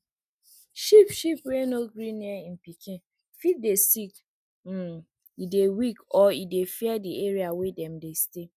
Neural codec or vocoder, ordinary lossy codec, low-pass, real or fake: none; none; 14.4 kHz; real